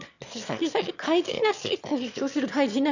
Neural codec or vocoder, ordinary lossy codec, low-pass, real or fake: autoencoder, 22.05 kHz, a latent of 192 numbers a frame, VITS, trained on one speaker; none; 7.2 kHz; fake